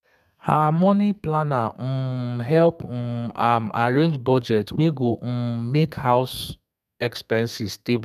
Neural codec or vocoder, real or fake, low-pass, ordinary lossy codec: codec, 32 kHz, 1.9 kbps, SNAC; fake; 14.4 kHz; none